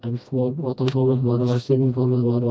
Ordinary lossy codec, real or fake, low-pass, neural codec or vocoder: none; fake; none; codec, 16 kHz, 1 kbps, FreqCodec, smaller model